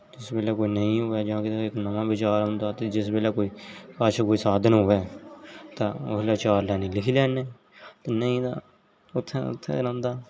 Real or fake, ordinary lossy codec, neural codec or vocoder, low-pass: real; none; none; none